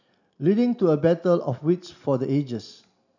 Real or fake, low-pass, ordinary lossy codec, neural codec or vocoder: real; 7.2 kHz; none; none